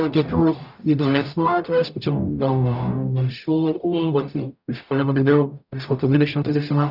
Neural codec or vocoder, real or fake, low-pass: codec, 44.1 kHz, 0.9 kbps, DAC; fake; 5.4 kHz